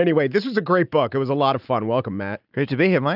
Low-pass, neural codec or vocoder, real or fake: 5.4 kHz; none; real